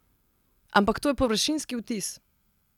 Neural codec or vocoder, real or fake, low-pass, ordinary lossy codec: none; real; 19.8 kHz; none